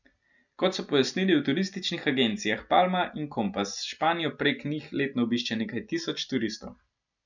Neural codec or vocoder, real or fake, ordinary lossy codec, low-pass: none; real; none; 7.2 kHz